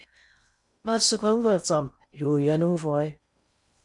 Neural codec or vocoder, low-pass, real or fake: codec, 16 kHz in and 24 kHz out, 0.6 kbps, FocalCodec, streaming, 4096 codes; 10.8 kHz; fake